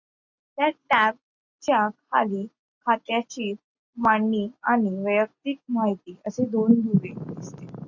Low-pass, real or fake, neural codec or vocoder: 7.2 kHz; real; none